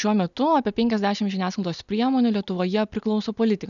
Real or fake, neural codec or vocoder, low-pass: real; none; 7.2 kHz